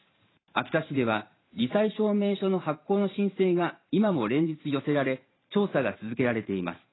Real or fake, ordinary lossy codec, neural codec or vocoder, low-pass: fake; AAC, 16 kbps; vocoder, 44.1 kHz, 128 mel bands every 512 samples, BigVGAN v2; 7.2 kHz